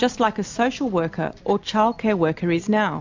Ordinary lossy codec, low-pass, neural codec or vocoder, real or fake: MP3, 64 kbps; 7.2 kHz; none; real